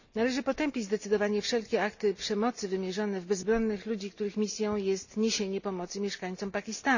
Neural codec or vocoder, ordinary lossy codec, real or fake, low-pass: none; none; real; 7.2 kHz